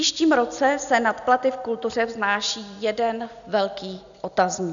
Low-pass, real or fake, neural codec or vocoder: 7.2 kHz; real; none